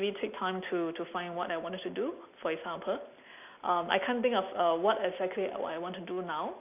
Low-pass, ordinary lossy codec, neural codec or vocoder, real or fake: 3.6 kHz; none; none; real